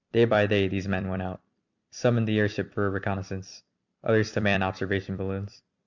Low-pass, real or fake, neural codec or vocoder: 7.2 kHz; fake; vocoder, 44.1 kHz, 128 mel bands every 256 samples, BigVGAN v2